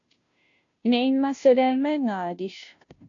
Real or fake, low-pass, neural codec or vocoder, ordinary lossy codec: fake; 7.2 kHz; codec, 16 kHz, 0.5 kbps, FunCodec, trained on Chinese and English, 25 frames a second; AAC, 48 kbps